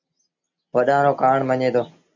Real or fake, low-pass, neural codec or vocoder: real; 7.2 kHz; none